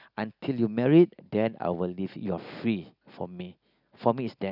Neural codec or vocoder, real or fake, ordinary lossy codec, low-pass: none; real; none; 5.4 kHz